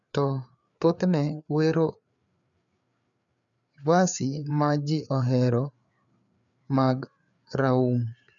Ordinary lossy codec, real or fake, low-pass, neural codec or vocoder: none; fake; 7.2 kHz; codec, 16 kHz, 4 kbps, FreqCodec, larger model